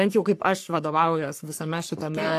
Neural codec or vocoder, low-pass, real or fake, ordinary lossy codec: codec, 44.1 kHz, 3.4 kbps, Pupu-Codec; 14.4 kHz; fake; AAC, 64 kbps